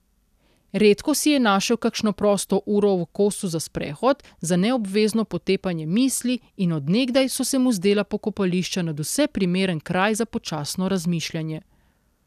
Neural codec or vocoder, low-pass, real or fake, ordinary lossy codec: none; 14.4 kHz; real; none